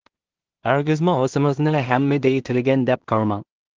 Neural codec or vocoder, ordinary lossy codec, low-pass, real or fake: codec, 16 kHz in and 24 kHz out, 0.4 kbps, LongCat-Audio-Codec, two codebook decoder; Opus, 16 kbps; 7.2 kHz; fake